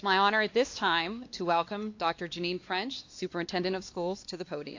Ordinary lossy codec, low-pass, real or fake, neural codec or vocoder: AAC, 48 kbps; 7.2 kHz; fake; codec, 16 kHz, 2 kbps, X-Codec, WavLM features, trained on Multilingual LibriSpeech